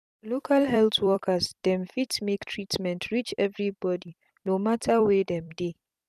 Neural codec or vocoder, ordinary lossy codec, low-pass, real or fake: vocoder, 44.1 kHz, 128 mel bands every 256 samples, BigVGAN v2; none; 14.4 kHz; fake